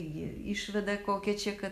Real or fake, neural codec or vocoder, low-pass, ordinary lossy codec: real; none; 14.4 kHz; AAC, 96 kbps